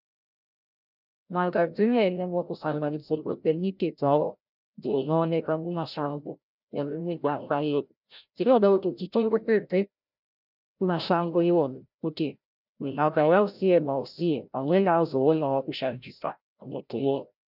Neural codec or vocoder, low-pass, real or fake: codec, 16 kHz, 0.5 kbps, FreqCodec, larger model; 5.4 kHz; fake